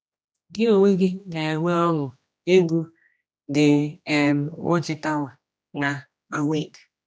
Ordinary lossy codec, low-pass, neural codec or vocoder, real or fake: none; none; codec, 16 kHz, 1 kbps, X-Codec, HuBERT features, trained on general audio; fake